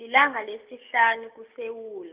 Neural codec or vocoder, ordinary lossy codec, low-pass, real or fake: none; Opus, 24 kbps; 3.6 kHz; real